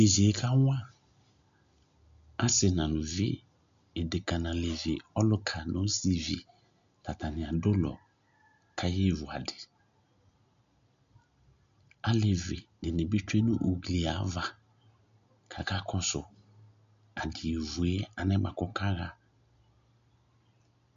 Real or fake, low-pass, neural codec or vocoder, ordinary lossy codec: real; 7.2 kHz; none; MP3, 64 kbps